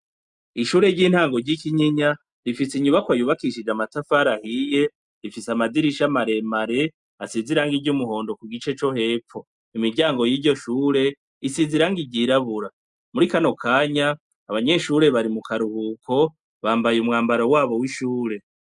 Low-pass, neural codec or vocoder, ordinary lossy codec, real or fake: 10.8 kHz; none; MP3, 96 kbps; real